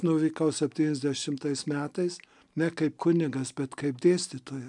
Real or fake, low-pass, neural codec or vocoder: real; 10.8 kHz; none